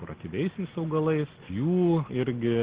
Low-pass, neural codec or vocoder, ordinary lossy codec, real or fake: 3.6 kHz; none; Opus, 16 kbps; real